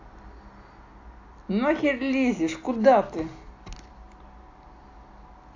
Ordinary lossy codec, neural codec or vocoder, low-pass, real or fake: AAC, 48 kbps; none; 7.2 kHz; real